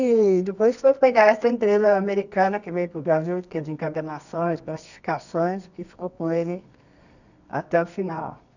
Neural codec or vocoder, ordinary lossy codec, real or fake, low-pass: codec, 24 kHz, 0.9 kbps, WavTokenizer, medium music audio release; none; fake; 7.2 kHz